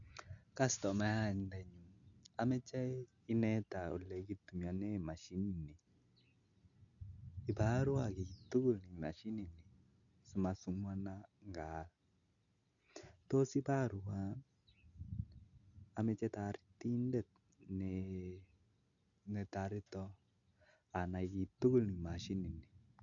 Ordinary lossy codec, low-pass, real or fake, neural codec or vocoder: none; 7.2 kHz; real; none